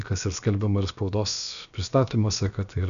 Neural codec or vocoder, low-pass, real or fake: codec, 16 kHz, about 1 kbps, DyCAST, with the encoder's durations; 7.2 kHz; fake